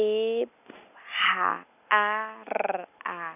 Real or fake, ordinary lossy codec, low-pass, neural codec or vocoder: real; none; 3.6 kHz; none